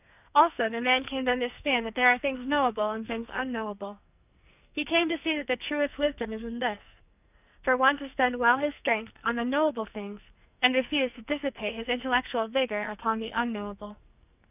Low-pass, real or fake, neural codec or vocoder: 3.6 kHz; fake; codec, 44.1 kHz, 2.6 kbps, SNAC